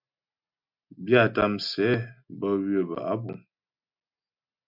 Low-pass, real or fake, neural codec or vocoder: 5.4 kHz; real; none